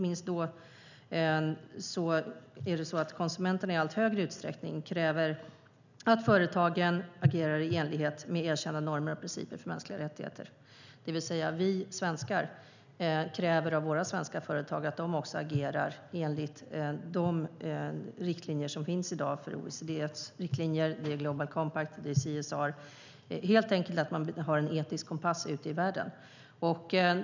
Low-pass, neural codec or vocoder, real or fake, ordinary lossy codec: 7.2 kHz; none; real; none